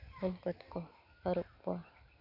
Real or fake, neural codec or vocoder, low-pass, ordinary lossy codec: real; none; 5.4 kHz; none